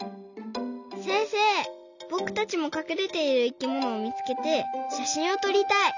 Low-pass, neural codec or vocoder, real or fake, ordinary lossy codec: 7.2 kHz; none; real; none